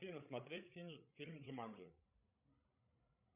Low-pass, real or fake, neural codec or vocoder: 3.6 kHz; fake; codec, 16 kHz, 16 kbps, FreqCodec, larger model